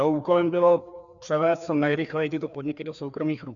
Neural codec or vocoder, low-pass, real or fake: codec, 16 kHz, 2 kbps, FreqCodec, larger model; 7.2 kHz; fake